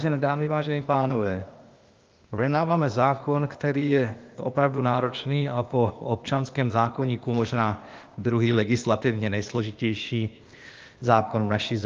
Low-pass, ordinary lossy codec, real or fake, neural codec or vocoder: 7.2 kHz; Opus, 24 kbps; fake; codec, 16 kHz, 0.8 kbps, ZipCodec